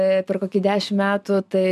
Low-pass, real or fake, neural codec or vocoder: 14.4 kHz; real; none